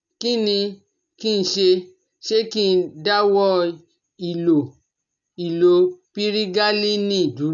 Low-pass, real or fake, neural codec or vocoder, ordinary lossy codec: 7.2 kHz; real; none; none